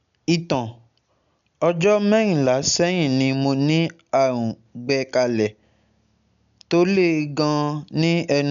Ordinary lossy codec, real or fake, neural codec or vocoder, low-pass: none; real; none; 7.2 kHz